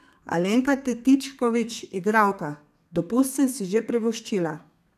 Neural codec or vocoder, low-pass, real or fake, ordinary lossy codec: codec, 32 kHz, 1.9 kbps, SNAC; 14.4 kHz; fake; none